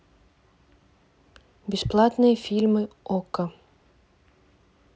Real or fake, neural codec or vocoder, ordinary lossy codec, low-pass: real; none; none; none